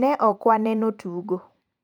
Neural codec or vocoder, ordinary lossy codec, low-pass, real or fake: vocoder, 44.1 kHz, 128 mel bands every 512 samples, BigVGAN v2; none; 19.8 kHz; fake